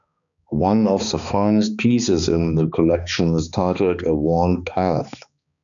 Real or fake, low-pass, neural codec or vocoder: fake; 7.2 kHz; codec, 16 kHz, 2 kbps, X-Codec, HuBERT features, trained on balanced general audio